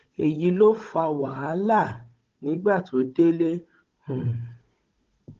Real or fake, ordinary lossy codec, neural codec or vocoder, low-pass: fake; Opus, 16 kbps; codec, 16 kHz, 16 kbps, FunCodec, trained on Chinese and English, 50 frames a second; 7.2 kHz